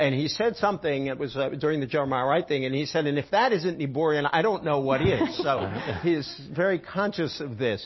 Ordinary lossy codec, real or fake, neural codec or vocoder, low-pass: MP3, 24 kbps; real; none; 7.2 kHz